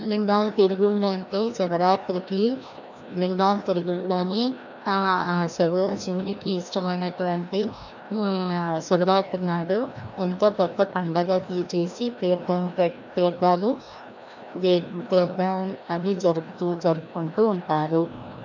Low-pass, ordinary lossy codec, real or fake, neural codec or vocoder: 7.2 kHz; none; fake; codec, 16 kHz, 1 kbps, FreqCodec, larger model